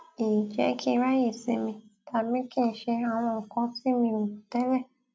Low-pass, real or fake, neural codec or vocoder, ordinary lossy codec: none; real; none; none